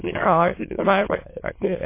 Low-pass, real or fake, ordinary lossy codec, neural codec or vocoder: 3.6 kHz; fake; MP3, 24 kbps; autoencoder, 22.05 kHz, a latent of 192 numbers a frame, VITS, trained on many speakers